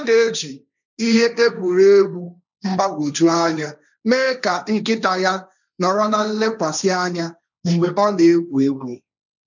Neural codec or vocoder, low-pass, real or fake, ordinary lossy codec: codec, 16 kHz, 1.1 kbps, Voila-Tokenizer; 7.2 kHz; fake; none